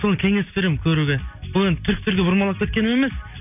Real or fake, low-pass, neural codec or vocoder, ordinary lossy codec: real; 3.6 kHz; none; none